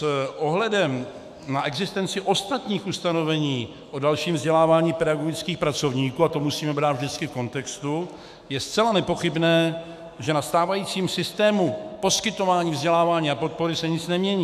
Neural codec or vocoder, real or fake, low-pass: autoencoder, 48 kHz, 128 numbers a frame, DAC-VAE, trained on Japanese speech; fake; 14.4 kHz